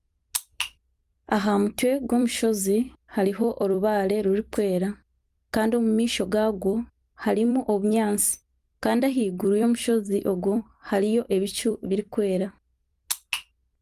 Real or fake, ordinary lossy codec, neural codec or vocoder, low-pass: fake; Opus, 32 kbps; vocoder, 44.1 kHz, 128 mel bands every 256 samples, BigVGAN v2; 14.4 kHz